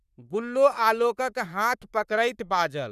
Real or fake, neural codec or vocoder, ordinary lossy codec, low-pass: fake; codec, 44.1 kHz, 3.4 kbps, Pupu-Codec; none; 14.4 kHz